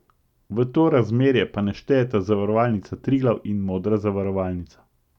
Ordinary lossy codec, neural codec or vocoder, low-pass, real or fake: none; none; 19.8 kHz; real